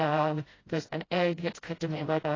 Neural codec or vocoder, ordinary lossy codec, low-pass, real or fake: codec, 16 kHz, 0.5 kbps, FreqCodec, smaller model; AAC, 32 kbps; 7.2 kHz; fake